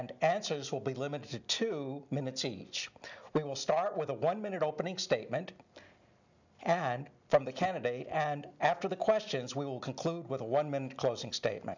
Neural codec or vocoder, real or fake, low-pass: none; real; 7.2 kHz